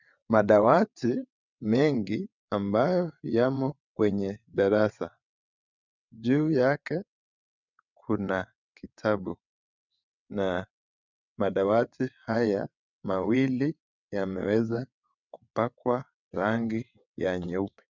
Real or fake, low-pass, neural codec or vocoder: fake; 7.2 kHz; vocoder, 22.05 kHz, 80 mel bands, WaveNeXt